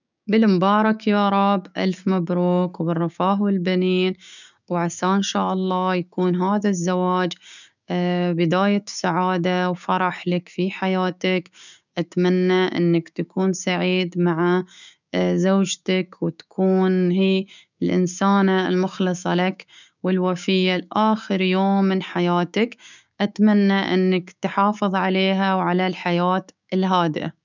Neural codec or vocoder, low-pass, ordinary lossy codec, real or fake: codec, 16 kHz, 6 kbps, DAC; 7.2 kHz; none; fake